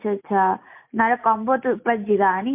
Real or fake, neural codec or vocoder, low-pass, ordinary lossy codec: real; none; 3.6 kHz; MP3, 32 kbps